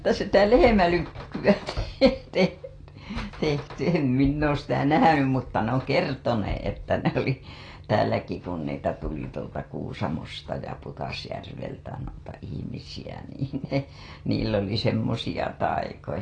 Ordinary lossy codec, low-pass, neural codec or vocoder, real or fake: AAC, 32 kbps; 9.9 kHz; none; real